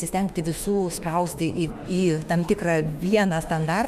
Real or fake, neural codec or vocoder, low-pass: fake; autoencoder, 48 kHz, 32 numbers a frame, DAC-VAE, trained on Japanese speech; 14.4 kHz